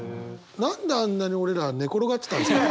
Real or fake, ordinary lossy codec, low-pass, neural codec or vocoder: real; none; none; none